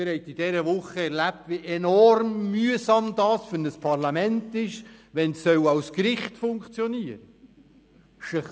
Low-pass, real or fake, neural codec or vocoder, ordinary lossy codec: none; real; none; none